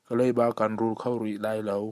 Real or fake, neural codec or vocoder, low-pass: real; none; 14.4 kHz